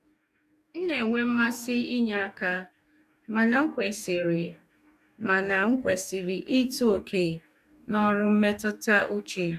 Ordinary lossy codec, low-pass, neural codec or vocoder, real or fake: none; 14.4 kHz; codec, 44.1 kHz, 2.6 kbps, DAC; fake